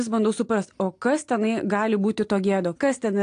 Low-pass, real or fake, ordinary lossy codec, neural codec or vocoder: 9.9 kHz; real; AAC, 48 kbps; none